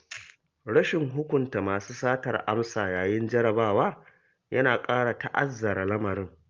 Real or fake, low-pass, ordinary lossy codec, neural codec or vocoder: real; 7.2 kHz; Opus, 32 kbps; none